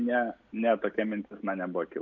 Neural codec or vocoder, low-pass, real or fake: none; 7.2 kHz; real